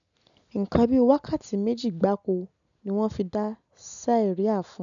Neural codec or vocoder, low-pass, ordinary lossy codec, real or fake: none; 7.2 kHz; none; real